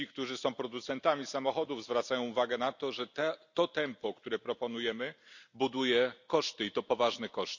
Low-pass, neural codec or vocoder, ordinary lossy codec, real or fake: 7.2 kHz; none; none; real